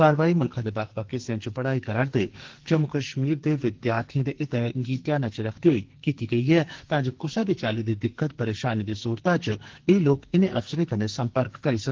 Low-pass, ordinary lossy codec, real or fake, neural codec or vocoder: 7.2 kHz; Opus, 16 kbps; fake; codec, 44.1 kHz, 2.6 kbps, SNAC